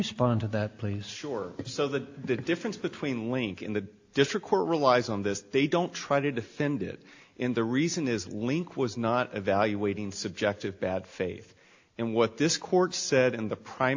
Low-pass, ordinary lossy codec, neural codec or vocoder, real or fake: 7.2 kHz; AAC, 48 kbps; none; real